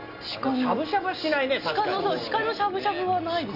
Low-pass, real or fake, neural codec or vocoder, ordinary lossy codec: 5.4 kHz; real; none; none